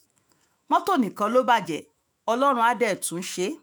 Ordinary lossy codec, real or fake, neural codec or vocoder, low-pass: none; fake; autoencoder, 48 kHz, 128 numbers a frame, DAC-VAE, trained on Japanese speech; none